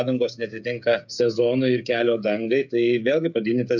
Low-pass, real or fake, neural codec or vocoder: 7.2 kHz; fake; codec, 16 kHz, 8 kbps, FreqCodec, smaller model